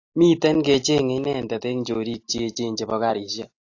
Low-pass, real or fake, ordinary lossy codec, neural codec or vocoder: 7.2 kHz; real; AAC, 48 kbps; none